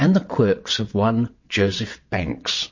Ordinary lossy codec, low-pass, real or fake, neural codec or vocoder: MP3, 32 kbps; 7.2 kHz; fake; vocoder, 22.05 kHz, 80 mel bands, WaveNeXt